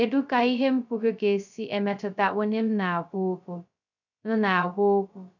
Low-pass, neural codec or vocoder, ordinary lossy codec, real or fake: 7.2 kHz; codec, 16 kHz, 0.2 kbps, FocalCodec; none; fake